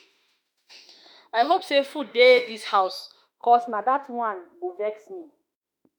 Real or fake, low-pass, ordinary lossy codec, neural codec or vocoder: fake; none; none; autoencoder, 48 kHz, 32 numbers a frame, DAC-VAE, trained on Japanese speech